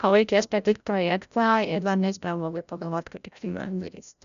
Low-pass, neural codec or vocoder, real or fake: 7.2 kHz; codec, 16 kHz, 0.5 kbps, FreqCodec, larger model; fake